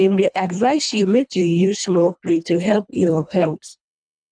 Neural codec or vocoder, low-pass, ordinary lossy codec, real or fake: codec, 24 kHz, 1.5 kbps, HILCodec; 9.9 kHz; none; fake